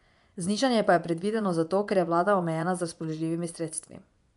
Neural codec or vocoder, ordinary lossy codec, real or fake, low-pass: vocoder, 24 kHz, 100 mel bands, Vocos; none; fake; 10.8 kHz